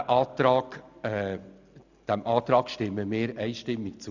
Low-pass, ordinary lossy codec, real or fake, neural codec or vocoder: 7.2 kHz; none; real; none